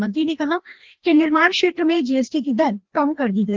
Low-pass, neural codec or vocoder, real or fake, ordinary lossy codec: 7.2 kHz; codec, 16 kHz, 1 kbps, FreqCodec, larger model; fake; Opus, 16 kbps